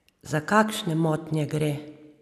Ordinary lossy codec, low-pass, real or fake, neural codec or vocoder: none; 14.4 kHz; real; none